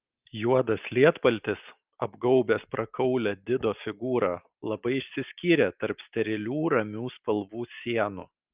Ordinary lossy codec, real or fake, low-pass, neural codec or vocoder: Opus, 24 kbps; real; 3.6 kHz; none